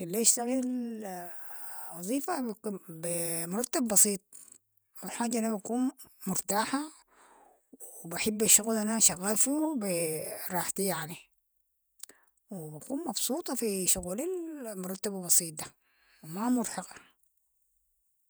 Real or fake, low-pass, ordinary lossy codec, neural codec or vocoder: fake; none; none; vocoder, 48 kHz, 128 mel bands, Vocos